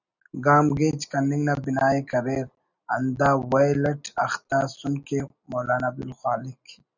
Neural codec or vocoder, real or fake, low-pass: none; real; 7.2 kHz